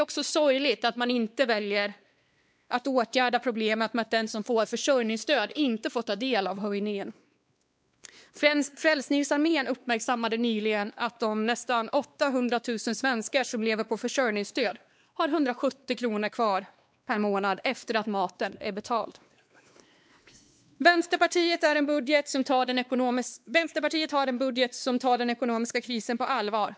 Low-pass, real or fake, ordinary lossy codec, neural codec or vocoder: none; fake; none; codec, 16 kHz, 2 kbps, X-Codec, WavLM features, trained on Multilingual LibriSpeech